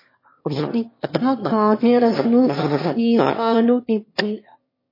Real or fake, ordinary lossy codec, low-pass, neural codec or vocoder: fake; MP3, 24 kbps; 5.4 kHz; autoencoder, 22.05 kHz, a latent of 192 numbers a frame, VITS, trained on one speaker